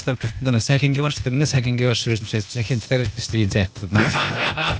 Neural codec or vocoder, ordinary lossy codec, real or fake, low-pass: codec, 16 kHz, 0.8 kbps, ZipCodec; none; fake; none